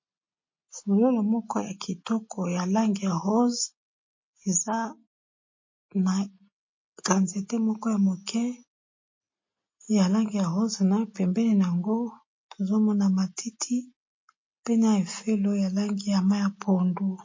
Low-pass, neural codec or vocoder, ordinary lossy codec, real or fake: 7.2 kHz; none; MP3, 32 kbps; real